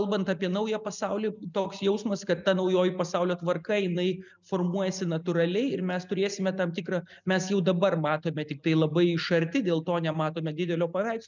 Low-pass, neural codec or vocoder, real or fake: 7.2 kHz; none; real